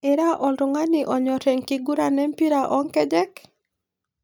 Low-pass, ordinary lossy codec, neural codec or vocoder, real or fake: none; none; none; real